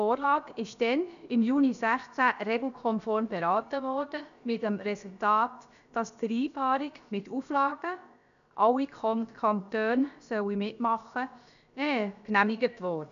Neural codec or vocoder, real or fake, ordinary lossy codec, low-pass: codec, 16 kHz, about 1 kbps, DyCAST, with the encoder's durations; fake; none; 7.2 kHz